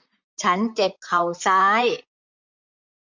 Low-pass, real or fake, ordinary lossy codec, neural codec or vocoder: 7.2 kHz; fake; MP3, 48 kbps; vocoder, 44.1 kHz, 128 mel bands, Pupu-Vocoder